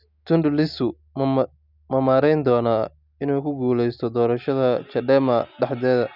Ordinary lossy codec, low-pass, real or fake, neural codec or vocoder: none; 5.4 kHz; real; none